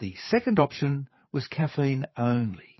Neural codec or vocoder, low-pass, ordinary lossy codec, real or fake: codec, 16 kHz, 4 kbps, FreqCodec, larger model; 7.2 kHz; MP3, 24 kbps; fake